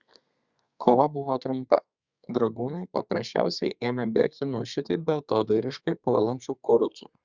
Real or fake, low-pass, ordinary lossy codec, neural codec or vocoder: fake; 7.2 kHz; Opus, 64 kbps; codec, 32 kHz, 1.9 kbps, SNAC